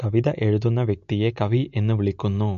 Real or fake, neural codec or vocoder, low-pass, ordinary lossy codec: real; none; 7.2 kHz; MP3, 48 kbps